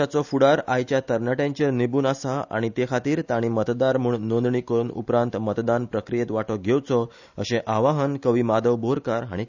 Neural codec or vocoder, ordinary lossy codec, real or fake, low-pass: none; none; real; 7.2 kHz